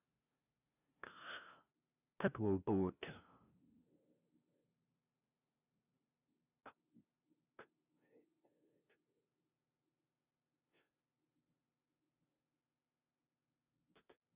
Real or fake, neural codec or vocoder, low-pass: fake; codec, 16 kHz, 0.5 kbps, FunCodec, trained on LibriTTS, 25 frames a second; 3.6 kHz